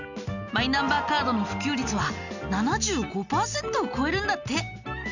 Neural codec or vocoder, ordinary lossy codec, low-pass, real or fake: none; none; 7.2 kHz; real